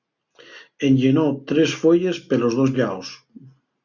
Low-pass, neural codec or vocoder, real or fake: 7.2 kHz; none; real